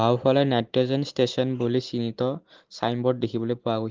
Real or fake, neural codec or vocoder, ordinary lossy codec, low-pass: real; none; Opus, 16 kbps; 7.2 kHz